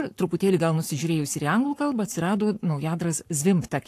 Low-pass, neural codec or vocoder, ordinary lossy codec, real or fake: 14.4 kHz; codec, 44.1 kHz, 7.8 kbps, Pupu-Codec; AAC, 64 kbps; fake